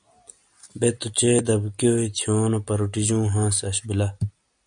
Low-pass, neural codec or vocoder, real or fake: 9.9 kHz; none; real